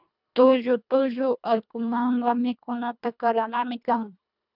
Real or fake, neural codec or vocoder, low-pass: fake; codec, 24 kHz, 1.5 kbps, HILCodec; 5.4 kHz